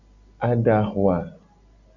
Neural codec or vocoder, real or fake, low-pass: none; real; 7.2 kHz